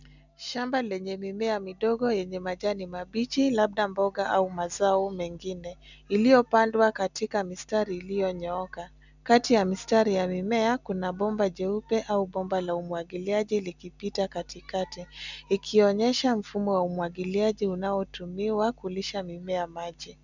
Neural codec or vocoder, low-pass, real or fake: none; 7.2 kHz; real